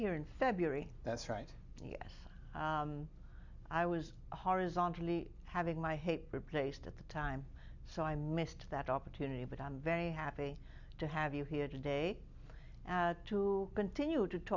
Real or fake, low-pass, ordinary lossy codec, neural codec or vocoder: real; 7.2 kHz; Opus, 64 kbps; none